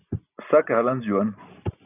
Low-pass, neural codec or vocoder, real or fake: 3.6 kHz; none; real